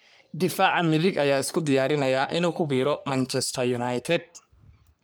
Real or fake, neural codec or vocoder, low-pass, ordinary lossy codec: fake; codec, 44.1 kHz, 3.4 kbps, Pupu-Codec; none; none